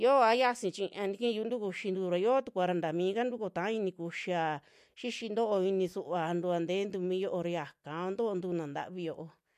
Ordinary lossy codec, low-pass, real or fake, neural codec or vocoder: MP3, 64 kbps; 14.4 kHz; real; none